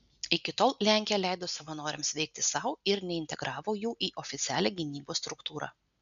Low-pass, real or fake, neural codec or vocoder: 7.2 kHz; real; none